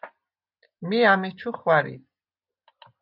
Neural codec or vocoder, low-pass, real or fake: none; 5.4 kHz; real